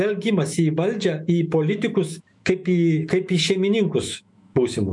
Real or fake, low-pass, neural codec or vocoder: fake; 10.8 kHz; autoencoder, 48 kHz, 128 numbers a frame, DAC-VAE, trained on Japanese speech